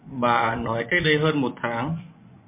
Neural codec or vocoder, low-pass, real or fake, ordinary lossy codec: vocoder, 24 kHz, 100 mel bands, Vocos; 3.6 kHz; fake; MP3, 24 kbps